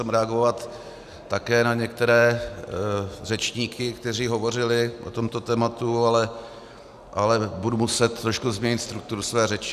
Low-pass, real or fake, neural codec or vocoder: 14.4 kHz; real; none